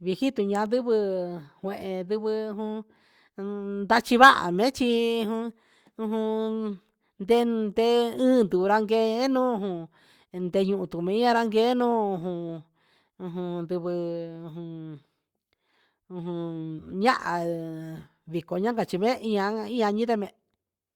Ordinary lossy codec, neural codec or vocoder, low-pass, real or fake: Opus, 64 kbps; codec, 44.1 kHz, 7.8 kbps, Pupu-Codec; 19.8 kHz; fake